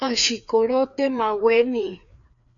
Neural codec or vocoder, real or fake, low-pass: codec, 16 kHz, 2 kbps, FreqCodec, larger model; fake; 7.2 kHz